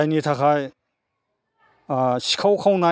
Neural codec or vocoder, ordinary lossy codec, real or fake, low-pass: none; none; real; none